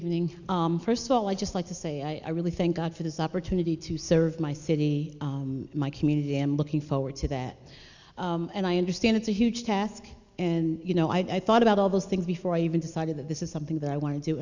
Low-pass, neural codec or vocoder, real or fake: 7.2 kHz; none; real